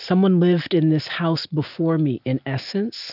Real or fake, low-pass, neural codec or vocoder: real; 5.4 kHz; none